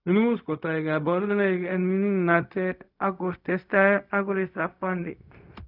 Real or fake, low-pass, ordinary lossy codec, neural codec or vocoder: fake; 5.4 kHz; none; codec, 16 kHz, 0.4 kbps, LongCat-Audio-Codec